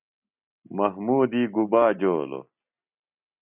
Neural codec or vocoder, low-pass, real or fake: none; 3.6 kHz; real